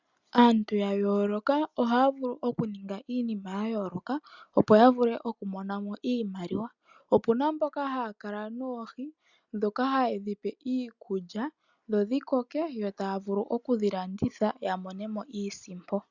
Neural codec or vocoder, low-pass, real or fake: none; 7.2 kHz; real